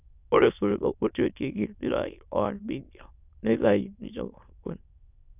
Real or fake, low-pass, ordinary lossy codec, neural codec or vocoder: fake; 3.6 kHz; none; autoencoder, 22.05 kHz, a latent of 192 numbers a frame, VITS, trained on many speakers